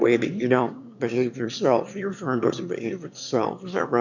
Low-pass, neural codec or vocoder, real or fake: 7.2 kHz; autoencoder, 22.05 kHz, a latent of 192 numbers a frame, VITS, trained on one speaker; fake